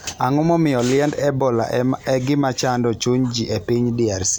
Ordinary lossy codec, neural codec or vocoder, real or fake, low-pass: none; none; real; none